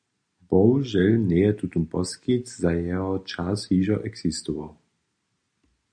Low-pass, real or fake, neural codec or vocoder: 9.9 kHz; real; none